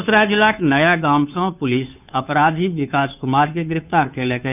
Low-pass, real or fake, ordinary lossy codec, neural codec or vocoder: 3.6 kHz; fake; none; codec, 16 kHz, 2 kbps, FunCodec, trained on Chinese and English, 25 frames a second